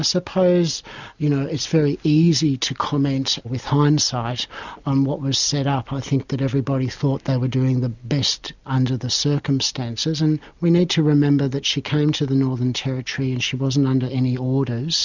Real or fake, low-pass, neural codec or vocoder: real; 7.2 kHz; none